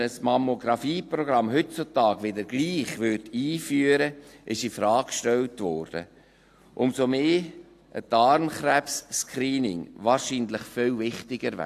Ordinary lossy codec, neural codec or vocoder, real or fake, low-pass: AAC, 64 kbps; none; real; 14.4 kHz